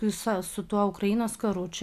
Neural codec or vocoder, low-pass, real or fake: none; 14.4 kHz; real